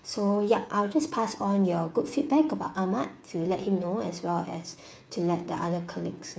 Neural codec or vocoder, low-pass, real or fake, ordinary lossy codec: codec, 16 kHz, 8 kbps, FreqCodec, smaller model; none; fake; none